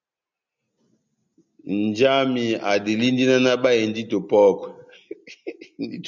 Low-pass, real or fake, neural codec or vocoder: 7.2 kHz; real; none